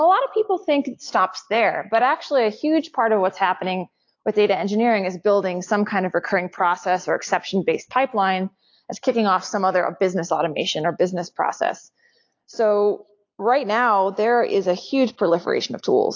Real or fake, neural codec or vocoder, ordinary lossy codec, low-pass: real; none; AAC, 48 kbps; 7.2 kHz